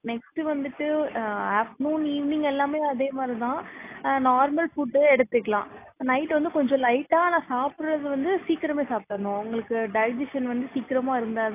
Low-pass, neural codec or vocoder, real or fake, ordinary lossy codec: 3.6 kHz; none; real; AAC, 24 kbps